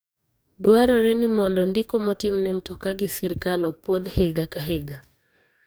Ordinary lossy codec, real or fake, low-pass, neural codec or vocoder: none; fake; none; codec, 44.1 kHz, 2.6 kbps, DAC